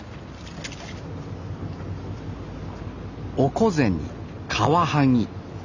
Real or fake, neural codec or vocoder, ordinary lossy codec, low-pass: real; none; none; 7.2 kHz